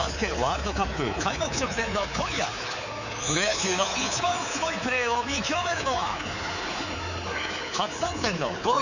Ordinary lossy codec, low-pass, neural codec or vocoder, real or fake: none; 7.2 kHz; codec, 24 kHz, 3.1 kbps, DualCodec; fake